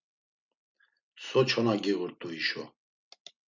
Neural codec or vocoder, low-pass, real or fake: none; 7.2 kHz; real